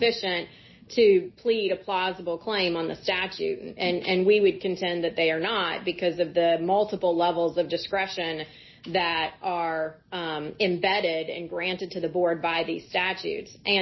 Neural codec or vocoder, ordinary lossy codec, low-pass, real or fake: none; MP3, 24 kbps; 7.2 kHz; real